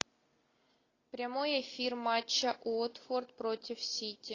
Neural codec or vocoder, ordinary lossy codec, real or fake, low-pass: none; AAC, 32 kbps; real; 7.2 kHz